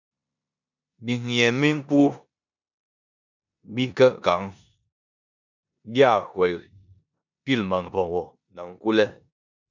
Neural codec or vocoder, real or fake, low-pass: codec, 16 kHz in and 24 kHz out, 0.9 kbps, LongCat-Audio-Codec, four codebook decoder; fake; 7.2 kHz